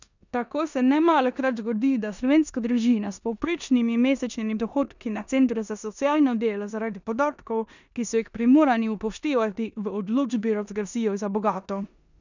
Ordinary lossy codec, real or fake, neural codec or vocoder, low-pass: none; fake; codec, 16 kHz in and 24 kHz out, 0.9 kbps, LongCat-Audio-Codec, four codebook decoder; 7.2 kHz